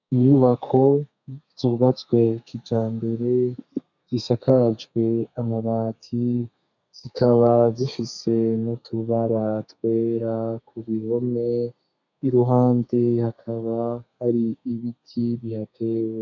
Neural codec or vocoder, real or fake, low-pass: codec, 32 kHz, 1.9 kbps, SNAC; fake; 7.2 kHz